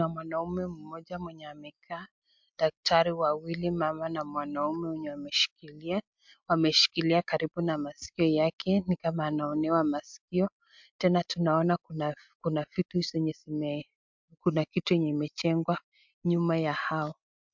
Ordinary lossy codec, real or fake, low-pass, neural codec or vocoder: MP3, 64 kbps; real; 7.2 kHz; none